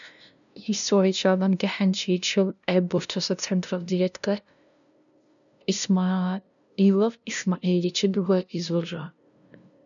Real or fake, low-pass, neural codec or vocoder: fake; 7.2 kHz; codec, 16 kHz, 0.5 kbps, FunCodec, trained on LibriTTS, 25 frames a second